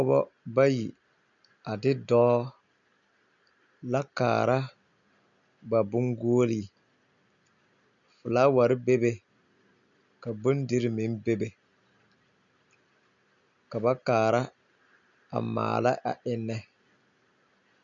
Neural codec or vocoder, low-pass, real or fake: none; 7.2 kHz; real